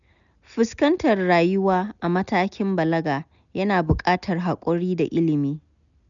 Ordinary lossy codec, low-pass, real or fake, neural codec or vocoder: none; 7.2 kHz; real; none